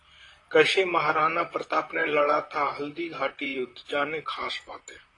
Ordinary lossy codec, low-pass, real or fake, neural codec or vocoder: AAC, 32 kbps; 10.8 kHz; fake; vocoder, 44.1 kHz, 128 mel bands, Pupu-Vocoder